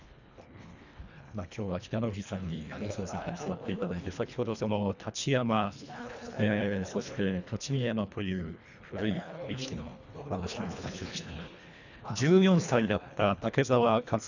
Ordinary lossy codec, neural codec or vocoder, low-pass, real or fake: none; codec, 24 kHz, 1.5 kbps, HILCodec; 7.2 kHz; fake